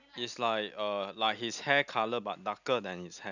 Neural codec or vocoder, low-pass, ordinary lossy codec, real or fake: none; 7.2 kHz; none; real